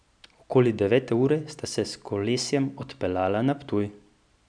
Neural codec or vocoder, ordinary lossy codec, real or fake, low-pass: none; none; real; 9.9 kHz